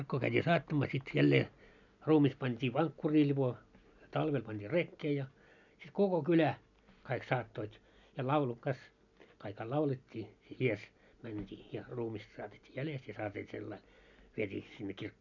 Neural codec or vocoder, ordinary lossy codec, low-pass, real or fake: none; none; 7.2 kHz; real